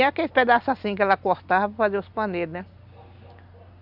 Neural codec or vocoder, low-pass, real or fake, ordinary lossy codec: none; 5.4 kHz; real; none